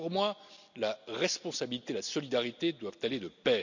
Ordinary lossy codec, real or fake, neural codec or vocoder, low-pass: none; real; none; 7.2 kHz